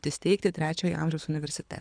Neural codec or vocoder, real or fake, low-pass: codec, 24 kHz, 6 kbps, HILCodec; fake; 9.9 kHz